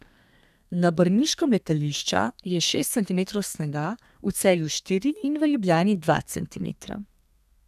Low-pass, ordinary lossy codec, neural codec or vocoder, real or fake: 14.4 kHz; none; codec, 32 kHz, 1.9 kbps, SNAC; fake